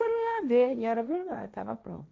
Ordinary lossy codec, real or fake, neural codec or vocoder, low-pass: none; fake; codec, 16 kHz, 1.1 kbps, Voila-Tokenizer; none